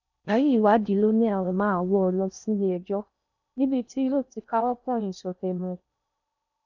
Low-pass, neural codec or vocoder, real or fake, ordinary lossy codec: 7.2 kHz; codec, 16 kHz in and 24 kHz out, 0.6 kbps, FocalCodec, streaming, 4096 codes; fake; none